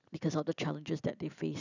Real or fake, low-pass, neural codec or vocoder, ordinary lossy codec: real; 7.2 kHz; none; none